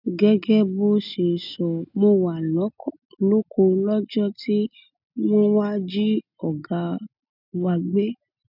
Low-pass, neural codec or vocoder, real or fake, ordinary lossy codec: 5.4 kHz; none; real; none